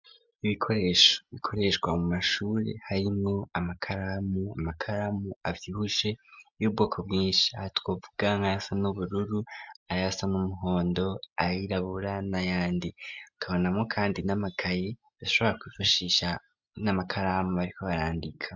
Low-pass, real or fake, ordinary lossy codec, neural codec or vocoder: 7.2 kHz; real; MP3, 64 kbps; none